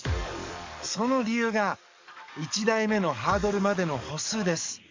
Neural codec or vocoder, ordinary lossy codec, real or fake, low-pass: codec, 44.1 kHz, 7.8 kbps, DAC; MP3, 64 kbps; fake; 7.2 kHz